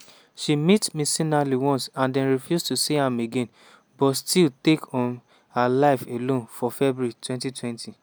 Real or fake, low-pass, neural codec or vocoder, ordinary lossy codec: real; none; none; none